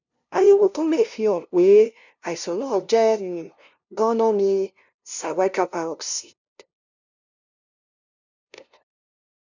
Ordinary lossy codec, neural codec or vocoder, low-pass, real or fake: none; codec, 16 kHz, 0.5 kbps, FunCodec, trained on LibriTTS, 25 frames a second; 7.2 kHz; fake